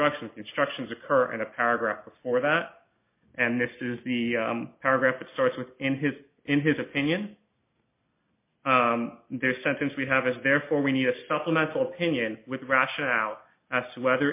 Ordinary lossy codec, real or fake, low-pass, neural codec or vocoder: MP3, 24 kbps; real; 3.6 kHz; none